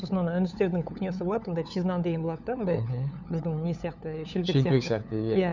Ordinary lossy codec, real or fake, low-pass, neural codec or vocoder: none; fake; 7.2 kHz; codec, 16 kHz, 8 kbps, FunCodec, trained on LibriTTS, 25 frames a second